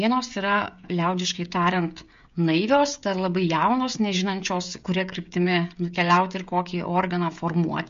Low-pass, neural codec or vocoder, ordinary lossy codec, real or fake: 7.2 kHz; codec, 16 kHz, 16 kbps, FreqCodec, smaller model; MP3, 48 kbps; fake